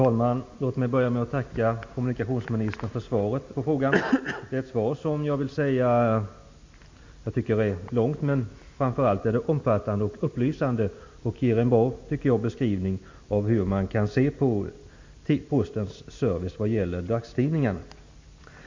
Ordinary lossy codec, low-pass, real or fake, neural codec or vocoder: MP3, 48 kbps; 7.2 kHz; real; none